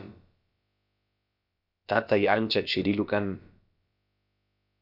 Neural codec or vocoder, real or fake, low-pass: codec, 16 kHz, about 1 kbps, DyCAST, with the encoder's durations; fake; 5.4 kHz